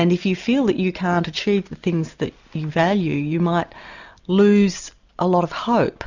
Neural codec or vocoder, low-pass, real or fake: none; 7.2 kHz; real